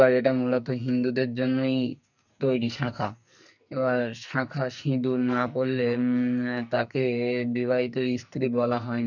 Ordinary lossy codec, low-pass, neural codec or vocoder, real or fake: none; 7.2 kHz; codec, 44.1 kHz, 2.6 kbps, SNAC; fake